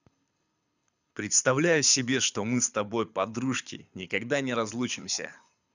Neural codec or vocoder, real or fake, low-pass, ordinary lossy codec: codec, 24 kHz, 6 kbps, HILCodec; fake; 7.2 kHz; none